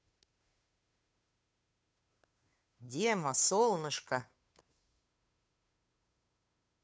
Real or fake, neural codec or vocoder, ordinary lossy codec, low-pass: fake; codec, 16 kHz, 2 kbps, FunCodec, trained on Chinese and English, 25 frames a second; none; none